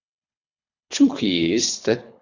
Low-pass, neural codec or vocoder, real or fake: 7.2 kHz; codec, 24 kHz, 3 kbps, HILCodec; fake